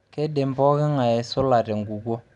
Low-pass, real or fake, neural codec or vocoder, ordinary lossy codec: 10.8 kHz; real; none; none